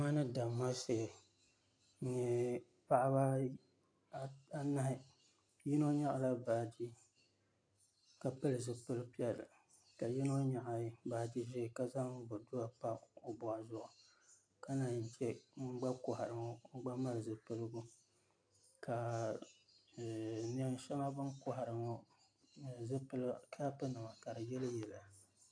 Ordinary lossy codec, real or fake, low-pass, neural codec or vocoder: AAC, 64 kbps; real; 9.9 kHz; none